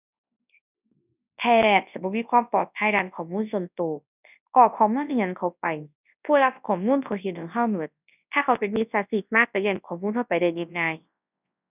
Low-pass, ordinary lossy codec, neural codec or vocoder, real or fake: 3.6 kHz; none; codec, 24 kHz, 0.9 kbps, WavTokenizer, large speech release; fake